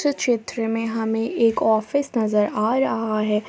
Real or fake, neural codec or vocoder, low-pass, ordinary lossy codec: real; none; none; none